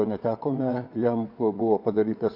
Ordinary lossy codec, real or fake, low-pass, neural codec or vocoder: AAC, 48 kbps; fake; 5.4 kHz; vocoder, 22.05 kHz, 80 mel bands, WaveNeXt